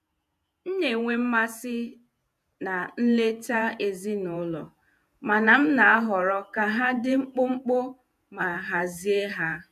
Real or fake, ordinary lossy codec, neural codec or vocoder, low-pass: fake; none; vocoder, 48 kHz, 128 mel bands, Vocos; 14.4 kHz